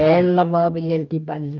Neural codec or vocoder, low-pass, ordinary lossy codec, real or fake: codec, 44.1 kHz, 2.6 kbps, DAC; 7.2 kHz; none; fake